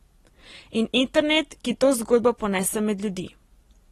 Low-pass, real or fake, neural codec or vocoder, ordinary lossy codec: 19.8 kHz; real; none; AAC, 32 kbps